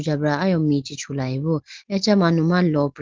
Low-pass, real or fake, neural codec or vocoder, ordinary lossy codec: 7.2 kHz; real; none; Opus, 16 kbps